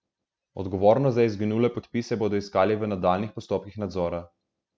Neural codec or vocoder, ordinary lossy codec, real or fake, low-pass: none; none; real; none